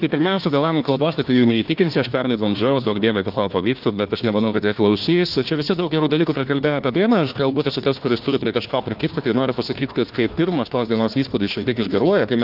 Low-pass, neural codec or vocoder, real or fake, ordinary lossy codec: 5.4 kHz; codec, 16 kHz, 1 kbps, FunCodec, trained on Chinese and English, 50 frames a second; fake; Opus, 24 kbps